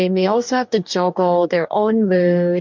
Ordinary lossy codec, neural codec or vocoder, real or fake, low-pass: MP3, 64 kbps; codec, 44.1 kHz, 2.6 kbps, DAC; fake; 7.2 kHz